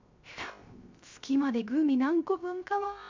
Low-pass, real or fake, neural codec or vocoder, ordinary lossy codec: 7.2 kHz; fake; codec, 16 kHz, 0.3 kbps, FocalCodec; MP3, 64 kbps